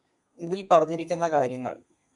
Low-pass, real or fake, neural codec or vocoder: 10.8 kHz; fake; codec, 32 kHz, 1.9 kbps, SNAC